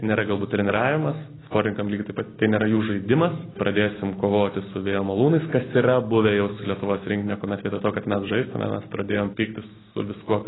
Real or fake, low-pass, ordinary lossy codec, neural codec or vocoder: real; 7.2 kHz; AAC, 16 kbps; none